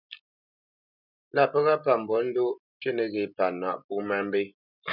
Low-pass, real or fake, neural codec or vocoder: 5.4 kHz; real; none